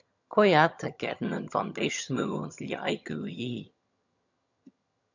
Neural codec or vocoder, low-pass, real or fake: vocoder, 22.05 kHz, 80 mel bands, HiFi-GAN; 7.2 kHz; fake